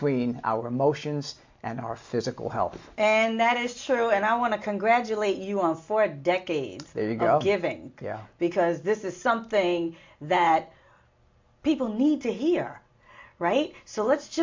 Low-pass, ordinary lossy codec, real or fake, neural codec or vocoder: 7.2 kHz; MP3, 48 kbps; real; none